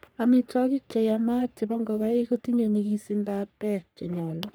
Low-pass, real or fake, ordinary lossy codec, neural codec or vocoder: none; fake; none; codec, 44.1 kHz, 3.4 kbps, Pupu-Codec